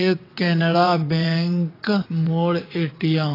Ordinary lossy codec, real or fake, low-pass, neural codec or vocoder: AAC, 24 kbps; fake; 5.4 kHz; vocoder, 22.05 kHz, 80 mel bands, WaveNeXt